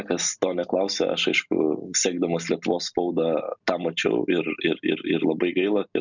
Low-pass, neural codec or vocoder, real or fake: 7.2 kHz; none; real